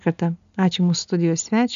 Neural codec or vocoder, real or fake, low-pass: none; real; 7.2 kHz